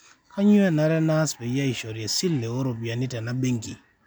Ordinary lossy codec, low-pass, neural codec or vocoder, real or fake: none; none; none; real